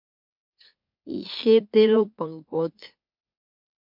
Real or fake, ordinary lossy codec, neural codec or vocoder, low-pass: fake; MP3, 48 kbps; autoencoder, 44.1 kHz, a latent of 192 numbers a frame, MeloTTS; 5.4 kHz